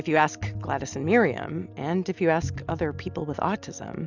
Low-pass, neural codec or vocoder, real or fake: 7.2 kHz; none; real